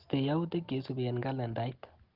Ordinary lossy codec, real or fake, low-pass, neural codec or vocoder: Opus, 16 kbps; real; 5.4 kHz; none